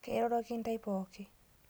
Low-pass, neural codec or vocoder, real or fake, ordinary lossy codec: none; none; real; none